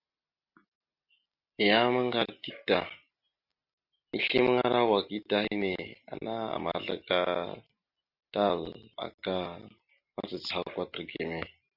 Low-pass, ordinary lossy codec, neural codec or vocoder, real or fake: 5.4 kHz; Opus, 64 kbps; none; real